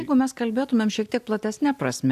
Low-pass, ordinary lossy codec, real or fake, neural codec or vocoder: 14.4 kHz; AAC, 96 kbps; real; none